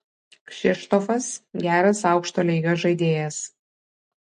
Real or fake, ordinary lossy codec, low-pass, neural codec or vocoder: real; MP3, 48 kbps; 10.8 kHz; none